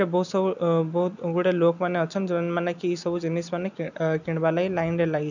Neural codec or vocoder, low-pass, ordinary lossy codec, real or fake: none; 7.2 kHz; none; real